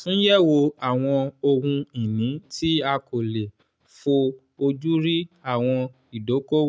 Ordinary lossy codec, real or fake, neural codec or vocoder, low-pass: none; real; none; none